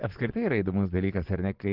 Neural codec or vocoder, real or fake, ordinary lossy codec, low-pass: vocoder, 24 kHz, 100 mel bands, Vocos; fake; Opus, 16 kbps; 5.4 kHz